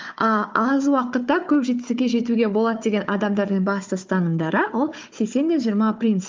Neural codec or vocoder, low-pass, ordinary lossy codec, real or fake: codec, 16 kHz, 4 kbps, FunCodec, trained on Chinese and English, 50 frames a second; 7.2 kHz; Opus, 32 kbps; fake